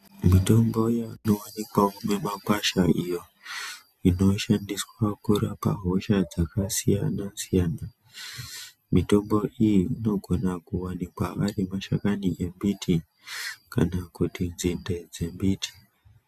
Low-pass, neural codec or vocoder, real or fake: 14.4 kHz; none; real